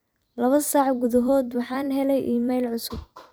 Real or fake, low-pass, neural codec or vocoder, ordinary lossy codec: fake; none; vocoder, 44.1 kHz, 128 mel bands every 256 samples, BigVGAN v2; none